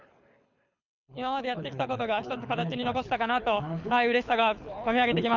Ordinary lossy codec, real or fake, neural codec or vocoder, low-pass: none; fake; codec, 24 kHz, 6 kbps, HILCodec; 7.2 kHz